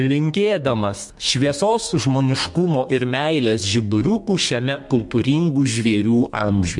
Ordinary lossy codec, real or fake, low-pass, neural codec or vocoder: MP3, 64 kbps; fake; 10.8 kHz; codec, 32 kHz, 1.9 kbps, SNAC